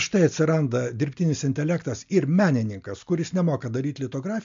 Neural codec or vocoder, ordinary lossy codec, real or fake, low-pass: none; MP3, 64 kbps; real; 7.2 kHz